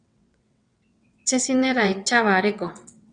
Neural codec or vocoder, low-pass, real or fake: vocoder, 22.05 kHz, 80 mel bands, WaveNeXt; 9.9 kHz; fake